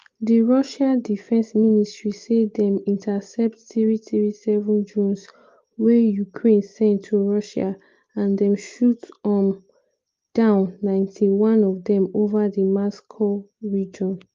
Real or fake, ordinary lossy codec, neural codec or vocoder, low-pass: real; Opus, 32 kbps; none; 7.2 kHz